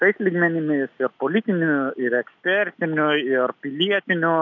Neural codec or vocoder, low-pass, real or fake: none; 7.2 kHz; real